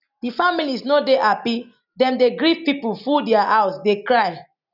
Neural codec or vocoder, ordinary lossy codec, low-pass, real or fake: none; none; 5.4 kHz; real